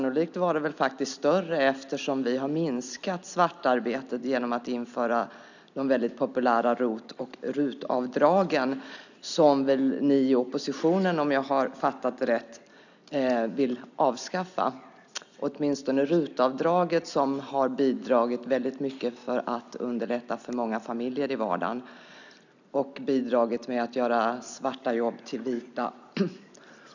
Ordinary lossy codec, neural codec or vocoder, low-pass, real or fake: none; none; 7.2 kHz; real